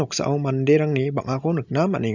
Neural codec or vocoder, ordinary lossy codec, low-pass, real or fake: none; none; 7.2 kHz; real